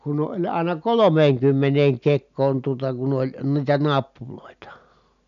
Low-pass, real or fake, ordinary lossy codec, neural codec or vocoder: 7.2 kHz; real; none; none